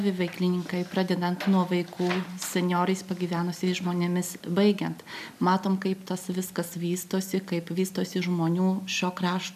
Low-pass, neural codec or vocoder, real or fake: 14.4 kHz; none; real